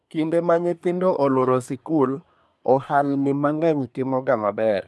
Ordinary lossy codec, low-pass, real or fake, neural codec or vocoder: none; none; fake; codec, 24 kHz, 1 kbps, SNAC